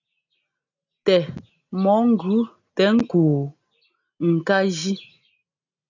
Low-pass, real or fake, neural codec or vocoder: 7.2 kHz; real; none